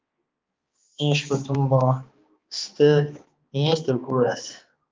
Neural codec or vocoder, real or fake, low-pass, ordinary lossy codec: codec, 16 kHz, 2 kbps, X-Codec, HuBERT features, trained on balanced general audio; fake; 7.2 kHz; Opus, 32 kbps